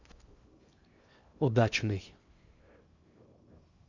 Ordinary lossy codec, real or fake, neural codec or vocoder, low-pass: Opus, 64 kbps; fake; codec, 16 kHz in and 24 kHz out, 0.8 kbps, FocalCodec, streaming, 65536 codes; 7.2 kHz